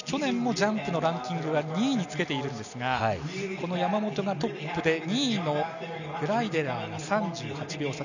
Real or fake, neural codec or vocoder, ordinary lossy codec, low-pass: real; none; none; 7.2 kHz